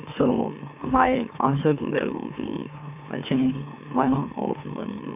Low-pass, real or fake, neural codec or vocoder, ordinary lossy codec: 3.6 kHz; fake; autoencoder, 44.1 kHz, a latent of 192 numbers a frame, MeloTTS; AAC, 32 kbps